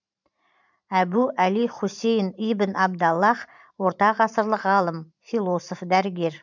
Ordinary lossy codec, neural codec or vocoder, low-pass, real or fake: none; vocoder, 44.1 kHz, 128 mel bands every 512 samples, BigVGAN v2; 7.2 kHz; fake